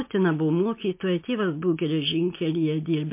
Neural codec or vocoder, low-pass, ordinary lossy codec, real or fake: none; 3.6 kHz; MP3, 24 kbps; real